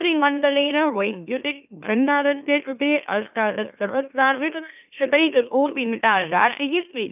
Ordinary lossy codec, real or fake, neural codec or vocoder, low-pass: none; fake; autoencoder, 44.1 kHz, a latent of 192 numbers a frame, MeloTTS; 3.6 kHz